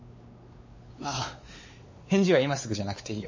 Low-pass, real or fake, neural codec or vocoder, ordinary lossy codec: 7.2 kHz; fake; codec, 16 kHz, 4 kbps, X-Codec, WavLM features, trained on Multilingual LibriSpeech; MP3, 32 kbps